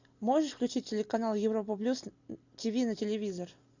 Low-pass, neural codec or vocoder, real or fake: 7.2 kHz; none; real